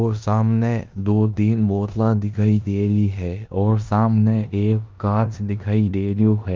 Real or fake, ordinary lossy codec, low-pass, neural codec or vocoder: fake; Opus, 24 kbps; 7.2 kHz; codec, 16 kHz in and 24 kHz out, 0.9 kbps, LongCat-Audio-Codec, four codebook decoder